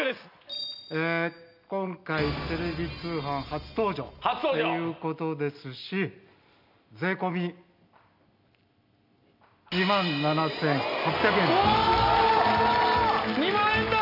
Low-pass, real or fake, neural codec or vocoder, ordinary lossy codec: 5.4 kHz; real; none; none